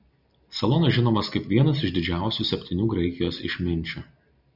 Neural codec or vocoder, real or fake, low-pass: none; real; 5.4 kHz